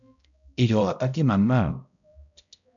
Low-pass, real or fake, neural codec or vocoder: 7.2 kHz; fake; codec, 16 kHz, 0.5 kbps, X-Codec, HuBERT features, trained on balanced general audio